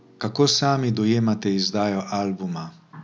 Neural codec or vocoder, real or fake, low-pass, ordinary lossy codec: none; real; none; none